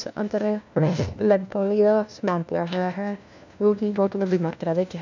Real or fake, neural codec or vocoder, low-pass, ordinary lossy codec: fake; codec, 16 kHz, 1 kbps, FunCodec, trained on LibriTTS, 50 frames a second; 7.2 kHz; none